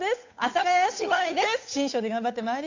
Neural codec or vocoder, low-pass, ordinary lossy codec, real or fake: codec, 16 kHz, 8 kbps, FunCodec, trained on Chinese and English, 25 frames a second; 7.2 kHz; AAC, 48 kbps; fake